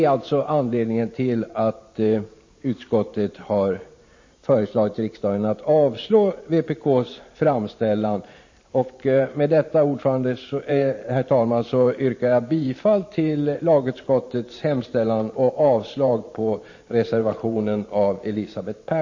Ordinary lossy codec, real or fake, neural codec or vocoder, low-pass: MP3, 32 kbps; fake; vocoder, 44.1 kHz, 128 mel bands every 512 samples, BigVGAN v2; 7.2 kHz